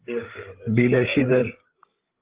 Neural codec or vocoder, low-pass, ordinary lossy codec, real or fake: codec, 16 kHz, 16 kbps, FreqCodec, larger model; 3.6 kHz; Opus, 16 kbps; fake